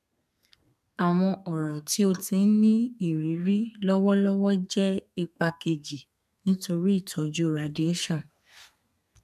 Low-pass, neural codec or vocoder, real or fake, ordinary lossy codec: 14.4 kHz; codec, 32 kHz, 1.9 kbps, SNAC; fake; none